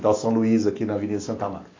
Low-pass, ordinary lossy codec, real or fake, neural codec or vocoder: 7.2 kHz; AAC, 32 kbps; real; none